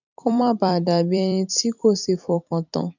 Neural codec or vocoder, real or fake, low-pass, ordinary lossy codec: vocoder, 44.1 kHz, 128 mel bands every 256 samples, BigVGAN v2; fake; 7.2 kHz; none